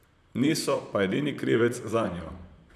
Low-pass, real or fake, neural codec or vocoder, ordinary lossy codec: 14.4 kHz; fake; vocoder, 44.1 kHz, 128 mel bands, Pupu-Vocoder; none